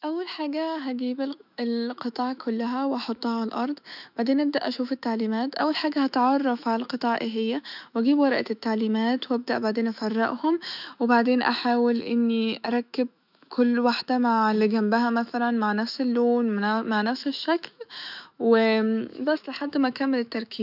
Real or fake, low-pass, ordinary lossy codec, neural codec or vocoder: fake; 5.4 kHz; none; autoencoder, 48 kHz, 128 numbers a frame, DAC-VAE, trained on Japanese speech